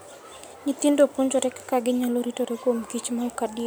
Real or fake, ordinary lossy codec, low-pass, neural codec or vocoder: real; none; none; none